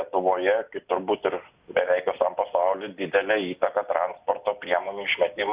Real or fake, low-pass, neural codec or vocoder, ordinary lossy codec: real; 3.6 kHz; none; Opus, 64 kbps